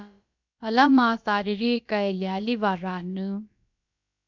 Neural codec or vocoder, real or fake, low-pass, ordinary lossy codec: codec, 16 kHz, about 1 kbps, DyCAST, with the encoder's durations; fake; 7.2 kHz; MP3, 64 kbps